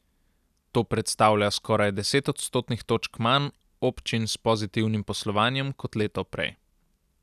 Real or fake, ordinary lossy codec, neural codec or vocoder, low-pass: real; none; none; 14.4 kHz